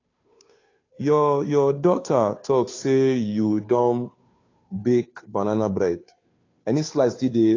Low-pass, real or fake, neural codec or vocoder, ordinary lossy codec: 7.2 kHz; fake; codec, 16 kHz, 2 kbps, FunCodec, trained on Chinese and English, 25 frames a second; AAC, 32 kbps